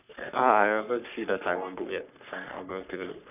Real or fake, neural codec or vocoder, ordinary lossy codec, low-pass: fake; codec, 44.1 kHz, 3.4 kbps, Pupu-Codec; none; 3.6 kHz